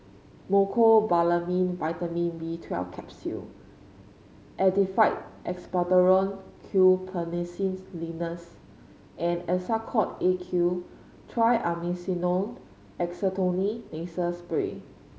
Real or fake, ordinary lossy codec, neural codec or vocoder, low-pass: real; none; none; none